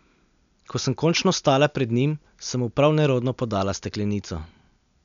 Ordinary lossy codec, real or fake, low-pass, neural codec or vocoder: none; real; 7.2 kHz; none